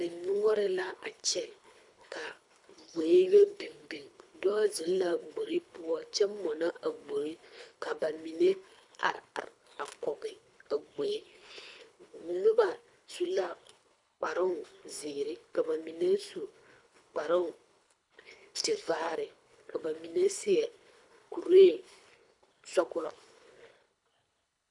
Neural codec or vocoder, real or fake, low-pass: codec, 24 kHz, 3 kbps, HILCodec; fake; 10.8 kHz